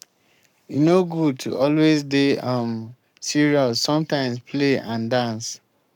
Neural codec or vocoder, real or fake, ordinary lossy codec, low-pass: codec, 44.1 kHz, 7.8 kbps, Pupu-Codec; fake; none; 19.8 kHz